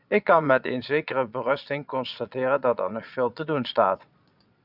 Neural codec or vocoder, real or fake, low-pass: vocoder, 22.05 kHz, 80 mel bands, Vocos; fake; 5.4 kHz